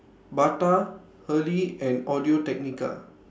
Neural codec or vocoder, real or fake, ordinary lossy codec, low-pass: none; real; none; none